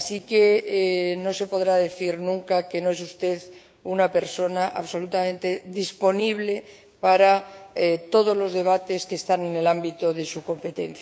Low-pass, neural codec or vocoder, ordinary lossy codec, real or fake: none; codec, 16 kHz, 6 kbps, DAC; none; fake